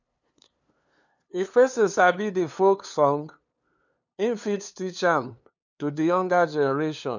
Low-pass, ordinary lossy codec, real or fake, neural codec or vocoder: 7.2 kHz; none; fake; codec, 16 kHz, 2 kbps, FunCodec, trained on LibriTTS, 25 frames a second